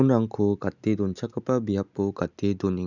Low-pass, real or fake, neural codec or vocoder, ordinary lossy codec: 7.2 kHz; real; none; none